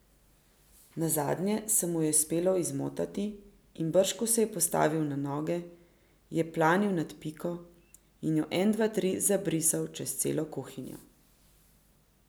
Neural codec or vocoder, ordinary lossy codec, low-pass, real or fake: none; none; none; real